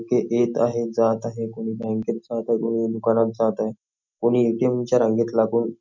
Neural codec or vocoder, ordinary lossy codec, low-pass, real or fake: none; none; 7.2 kHz; real